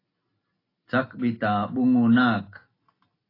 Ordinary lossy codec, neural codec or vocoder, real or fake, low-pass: AAC, 24 kbps; none; real; 5.4 kHz